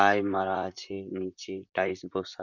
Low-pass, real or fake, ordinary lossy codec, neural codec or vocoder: 7.2 kHz; fake; none; vocoder, 44.1 kHz, 128 mel bands, Pupu-Vocoder